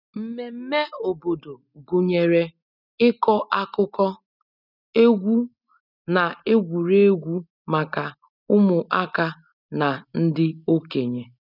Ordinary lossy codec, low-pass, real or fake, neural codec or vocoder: none; 5.4 kHz; real; none